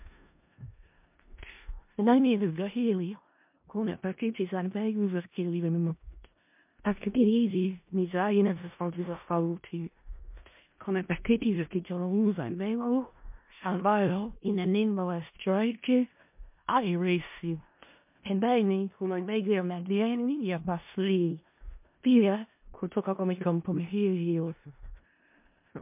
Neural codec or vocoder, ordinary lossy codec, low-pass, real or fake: codec, 16 kHz in and 24 kHz out, 0.4 kbps, LongCat-Audio-Codec, four codebook decoder; MP3, 32 kbps; 3.6 kHz; fake